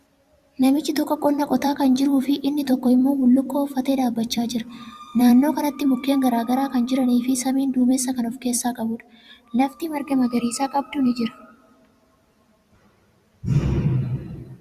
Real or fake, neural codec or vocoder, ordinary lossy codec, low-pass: fake; vocoder, 44.1 kHz, 128 mel bands every 256 samples, BigVGAN v2; Opus, 64 kbps; 14.4 kHz